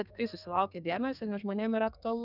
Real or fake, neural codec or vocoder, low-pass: fake; codec, 32 kHz, 1.9 kbps, SNAC; 5.4 kHz